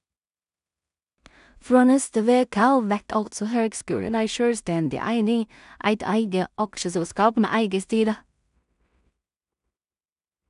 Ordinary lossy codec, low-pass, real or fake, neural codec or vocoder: none; 10.8 kHz; fake; codec, 16 kHz in and 24 kHz out, 0.4 kbps, LongCat-Audio-Codec, two codebook decoder